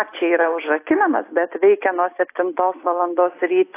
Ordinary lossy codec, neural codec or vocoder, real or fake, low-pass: AAC, 24 kbps; none; real; 3.6 kHz